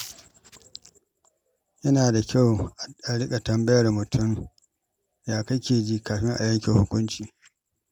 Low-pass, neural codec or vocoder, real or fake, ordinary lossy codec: 19.8 kHz; none; real; none